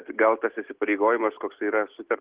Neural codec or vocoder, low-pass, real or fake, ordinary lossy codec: none; 3.6 kHz; real; Opus, 16 kbps